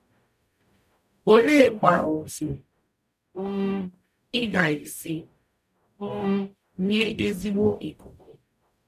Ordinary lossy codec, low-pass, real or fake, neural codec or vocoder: none; 14.4 kHz; fake; codec, 44.1 kHz, 0.9 kbps, DAC